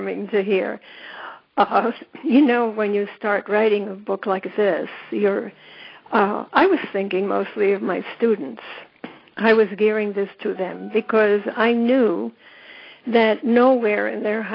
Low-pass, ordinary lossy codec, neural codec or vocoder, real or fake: 5.4 kHz; AAC, 24 kbps; none; real